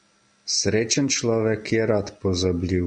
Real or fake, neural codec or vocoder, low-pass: real; none; 9.9 kHz